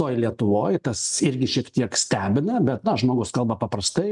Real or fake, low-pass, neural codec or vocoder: real; 10.8 kHz; none